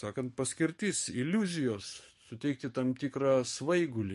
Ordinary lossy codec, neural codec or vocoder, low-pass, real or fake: MP3, 48 kbps; codec, 44.1 kHz, 7.8 kbps, DAC; 14.4 kHz; fake